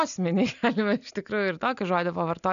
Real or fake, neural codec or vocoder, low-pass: real; none; 7.2 kHz